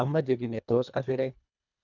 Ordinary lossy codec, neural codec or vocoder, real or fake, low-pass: none; codec, 24 kHz, 1.5 kbps, HILCodec; fake; 7.2 kHz